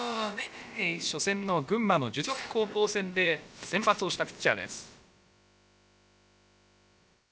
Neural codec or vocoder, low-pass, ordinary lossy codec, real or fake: codec, 16 kHz, about 1 kbps, DyCAST, with the encoder's durations; none; none; fake